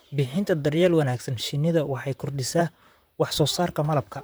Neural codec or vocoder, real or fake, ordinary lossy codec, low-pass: vocoder, 44.1 kHz, 128 mel bands, Pupu-Vocoder; fake; none; none